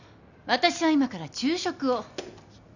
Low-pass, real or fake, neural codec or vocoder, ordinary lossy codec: 7.2 kHz; real; none; none